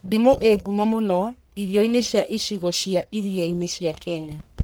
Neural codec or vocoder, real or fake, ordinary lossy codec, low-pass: codec, 44.1 kHz, 1.7 kbps, Pupu-Codec; fake; none; none